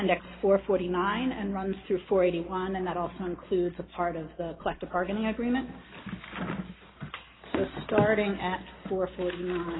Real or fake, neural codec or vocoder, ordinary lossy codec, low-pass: real; none; AAC, 16 kbps; 7.2 kHz